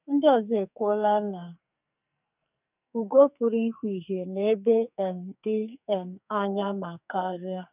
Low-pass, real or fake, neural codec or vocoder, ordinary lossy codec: 3.6 kHz; fake; codec, 44.1 kHz, 2.6 kbps, SNAC; none